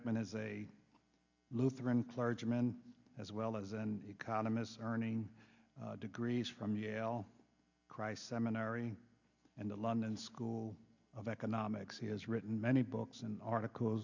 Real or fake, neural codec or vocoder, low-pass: real; none; 7.2 kHz